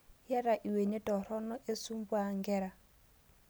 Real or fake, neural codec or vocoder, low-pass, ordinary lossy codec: real; none; none; none